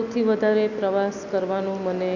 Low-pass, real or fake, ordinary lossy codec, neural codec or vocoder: 7.2 kHz; real; none; none